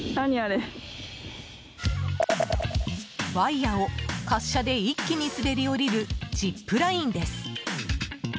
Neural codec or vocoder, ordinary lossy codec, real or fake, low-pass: none; none; real; none